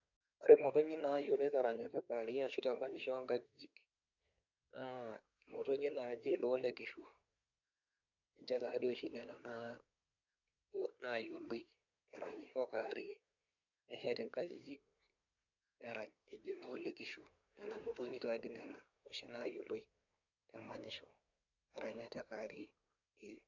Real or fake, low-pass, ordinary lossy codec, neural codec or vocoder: fake; 7.2 kHz; none; codec, 24 kHz, 1 kbps, SNAC